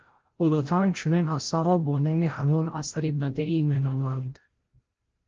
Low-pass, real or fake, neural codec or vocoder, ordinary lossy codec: 7.2 kHz; fake; codec, 16 kHz, 0.5 kbps, FreqCodec, larger model; Opus, 16 kbps